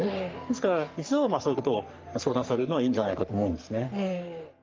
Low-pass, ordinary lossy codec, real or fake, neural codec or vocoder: 7.2 kHz; Opus, 24 kbps; fake; codec, 44.1 kHz, 3.4 kbps, Pupu-Codec